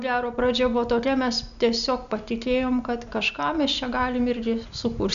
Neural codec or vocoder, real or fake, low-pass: none; real; 7.2 kHz